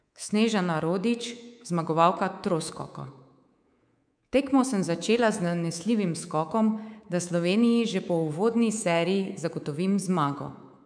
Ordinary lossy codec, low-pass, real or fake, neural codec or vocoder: none; 9.9 kHz; fake; codec, 24 kHz, 3.1 kbps, DualCodec